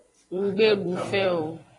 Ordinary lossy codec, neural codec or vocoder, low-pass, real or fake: AAC, 32 kbps; vocoder, 44.1 kHz, 128 mel bands every 256 samples, BigVGAN v2; 10.8 kHz; fake